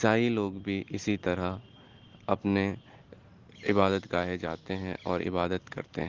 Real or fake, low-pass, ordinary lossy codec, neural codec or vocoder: real; 7.2 kHz; Opus, 16 kbps; none